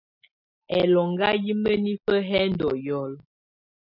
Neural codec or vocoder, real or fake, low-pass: none; real; 5.4 kHz